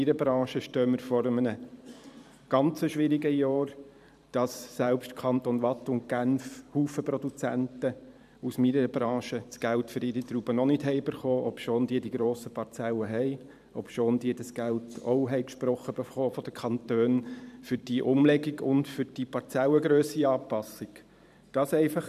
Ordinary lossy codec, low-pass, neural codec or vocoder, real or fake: none; 14.4 kHz; vocoder, 44.1 kHz, 128 mel bands every 512 samples, BigVGAN v2; fake